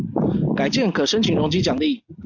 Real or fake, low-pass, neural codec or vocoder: real; 7.2 kHz; none